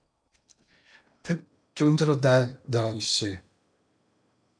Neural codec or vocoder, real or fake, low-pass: codec, 16 kHz in and 24 kHz out, 0.8 kbps, FocalCodec, streaming, 65536 codes; fake; 9.9 kHz